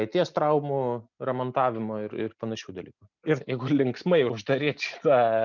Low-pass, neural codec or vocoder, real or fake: 7.2 kHz; none; real